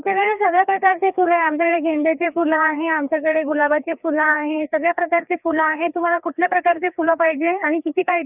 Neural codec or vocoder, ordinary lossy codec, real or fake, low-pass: codec, 16 kHz, 2 kbps, FreqCodec, larger model; none; fake; 3.6 kHz